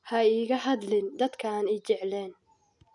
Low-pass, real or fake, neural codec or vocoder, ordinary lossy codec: 10.8 kHz; real; none; none